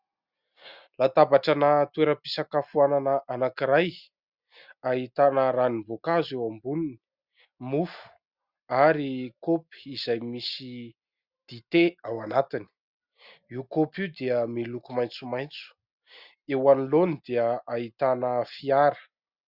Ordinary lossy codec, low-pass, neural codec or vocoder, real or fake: Opus, 64 kbps; 5.4 kHz; none; real